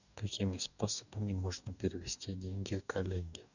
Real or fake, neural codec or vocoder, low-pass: fake; codec, 44.1 kHz, 2.6 kbps, DAC; 7.2 kHz